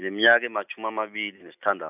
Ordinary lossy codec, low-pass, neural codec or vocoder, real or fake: none; 3.6 kHz; none; real